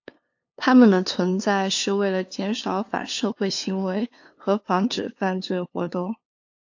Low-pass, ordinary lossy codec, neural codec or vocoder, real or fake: 7.2 kHz; AAC, 48 kbps; codec, 16 kHz, 2 kbps, FunCodec, trained on LibriTTS, 25 frames a second; fake